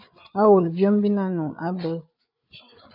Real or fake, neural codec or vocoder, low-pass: fake; codec, 16 kHz, 8 kbps, FreqCodec, larger model; 5.4 kHz